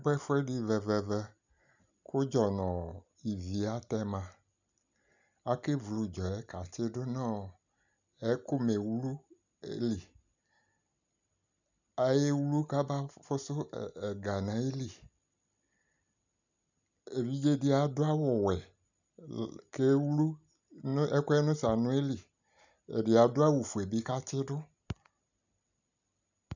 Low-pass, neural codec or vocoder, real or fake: 7.2 kHz; none; real